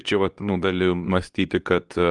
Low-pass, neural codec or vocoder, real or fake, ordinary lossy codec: 10.8 kHz; codec, 24 kHz, 0.9 kbps, WavTokenizer, medium speech release version 2; fake; Opus, 32 kbps